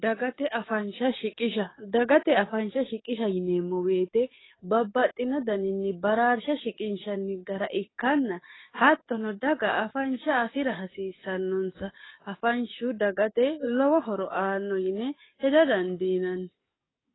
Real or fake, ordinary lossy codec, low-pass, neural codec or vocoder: fake; AAC, 16 kbps; 7.2 kHz; codec, 44.1 kHz, 7.8 kbps, DAC